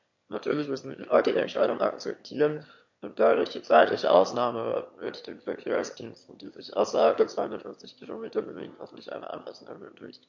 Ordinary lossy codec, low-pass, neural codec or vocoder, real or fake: MP3, 48 kbps; 7.2 kHz; autoencoder, 22.05 kHz, a latent of 192 numbers a frame, VITS, trained on one speaker; fake